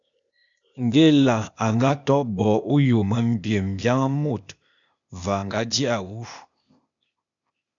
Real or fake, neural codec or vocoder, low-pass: fake; codec, 16 kHz, 0.8 kbps, ZipCodec; 7.2 kHz